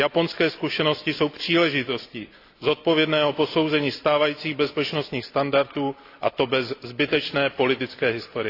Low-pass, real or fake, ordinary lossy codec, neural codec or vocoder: 5.4 kHz; real; AAC, 32 kbps; none